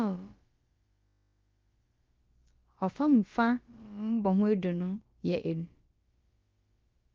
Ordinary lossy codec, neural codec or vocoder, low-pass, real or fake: Opus, 24 kbps; codec, 16 kHz, about 1 kbps, DyCAST, with the encoder's durations; 7.2 kHz; fake